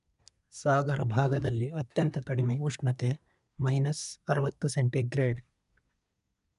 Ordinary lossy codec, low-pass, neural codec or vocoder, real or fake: none; 10.8 kHz; codec, 24 kHz, 1 kbps, SNAC; fake